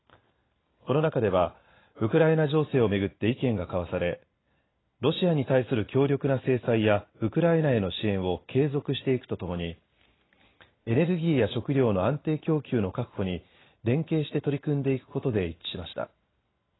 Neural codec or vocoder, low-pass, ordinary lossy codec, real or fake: none; 7.2 kHz; AAC, 16 kbps; real